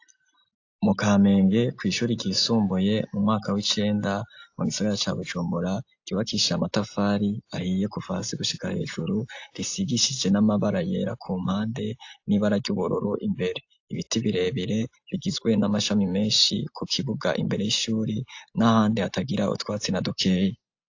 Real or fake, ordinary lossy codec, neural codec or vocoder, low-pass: real; AAC, 48 kbps; none; 7.2 kHz